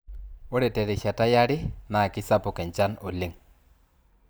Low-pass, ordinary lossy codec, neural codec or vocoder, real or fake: none; none; vocoder, 44.1 kHz, 128 mel bands every 512 samples, BigVGAN v2; fake